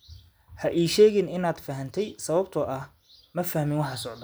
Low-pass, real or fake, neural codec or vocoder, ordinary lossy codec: none; real; none; none